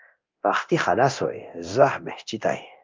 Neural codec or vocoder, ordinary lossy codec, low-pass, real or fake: codec, 24 kHz, 0.9 kbps, DualCodec; Opus, 24 kbps; 7.2 kHz; fake